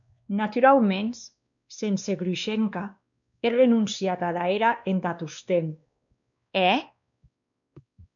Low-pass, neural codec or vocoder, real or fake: 7.2 kHz; codec, 16 kHz, 2 kbps, X-Codec, WavLM features, trained on Multilingual LibriSpeech; fake